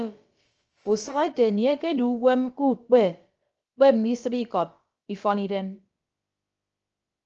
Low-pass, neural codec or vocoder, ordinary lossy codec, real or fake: 7.2 kHz; codec, 16 kHz, about 1 kbps, DyCAST, with the encoder's durations; Opus, 24 kbps; fake